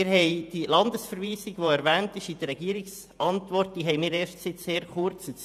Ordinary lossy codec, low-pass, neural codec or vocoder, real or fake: none; 14.4 kHz; vocoder, 48 kHz, 128 mel bands, Vocos; fake